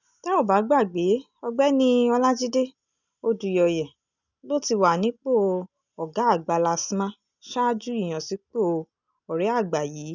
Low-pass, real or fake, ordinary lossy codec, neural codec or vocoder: 7.2 kHz; real; none; none